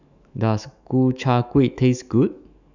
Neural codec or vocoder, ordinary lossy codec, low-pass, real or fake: autoencoder, 48 kHz, 128 numbers a frame, DAC-VAE, trained on Japanese speech; none; 7.2 kHz; fake